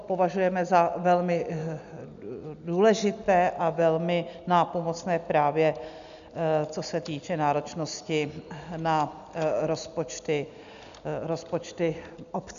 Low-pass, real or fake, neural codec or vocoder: 7.2 kHz; real; none